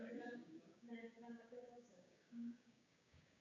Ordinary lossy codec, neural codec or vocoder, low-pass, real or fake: AAC, 64 kbps; codec, 16 kHz, 6 kbps, DAC; 7.2 kHz; fake